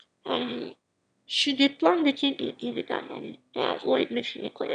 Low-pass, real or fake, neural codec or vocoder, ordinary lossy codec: 9.9 kHz; fake; autoencoder, 22.05 kHz, a latent of 192 numbers a frame, VITS, trained on one speaker; none